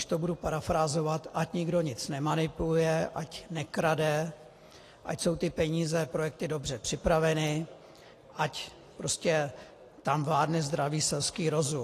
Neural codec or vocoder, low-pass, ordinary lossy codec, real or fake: none; 14.4 kHz; AAC, 48 kbps; real